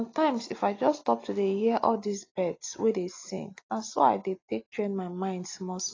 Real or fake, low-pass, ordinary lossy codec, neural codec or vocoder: real; 7.2 kHz; AAC, 32 kbps; none